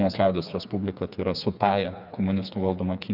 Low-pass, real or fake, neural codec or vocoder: 5.4 kHz; fake; codec, 16 kHz, 4 kbps, FreqCodec, smaller model